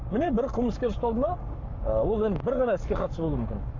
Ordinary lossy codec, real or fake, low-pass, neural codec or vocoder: Opus, 64 kbps; fake; 7.2 kHz; codec, 44.1 kHz, 7.8 kbps, Pupu-Codec